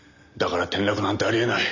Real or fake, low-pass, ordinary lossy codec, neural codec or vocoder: real; 7.2 kHz; none; none